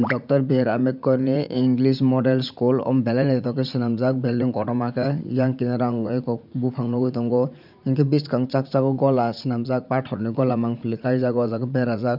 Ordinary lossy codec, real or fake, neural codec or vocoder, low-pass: none; fake; vocoder, 44.1 kHz, 80 mel bands, Vocos; 5.4 kHz